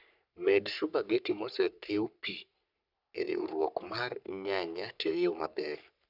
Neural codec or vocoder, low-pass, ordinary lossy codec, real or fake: codec, 44.1 kHz, 3.4 kbps, Pupu-Codec; 5.4 kHz; none; fake